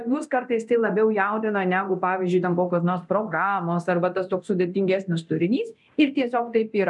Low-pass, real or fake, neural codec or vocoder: 10.8 kHz; fake; codec, 24 kHz, 0.9 kbps, DualCodec